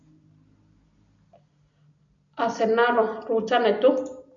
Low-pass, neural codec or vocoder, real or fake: 7.2 kHz; none; real